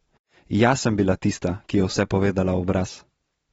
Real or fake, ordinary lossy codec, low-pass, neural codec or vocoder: real; AAC, 24 kbps; 14.4 kHz; none